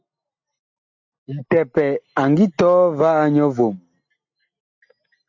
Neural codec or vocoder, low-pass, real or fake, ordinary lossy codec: none; 7.2 kHz; real; AAC, 48 kbps